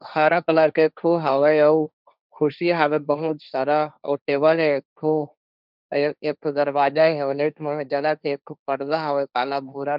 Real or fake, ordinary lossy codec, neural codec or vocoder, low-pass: fake; none; codec, 16 kHz, 1.1 kbps, Voila-Tokenizer; 5.4 kHz